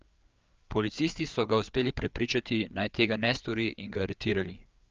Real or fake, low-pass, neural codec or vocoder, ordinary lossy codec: fake; 7.2 kHz; codec, 16 kHz, 4 kbps, FreqCodec, larger model; Opus, 24 kbps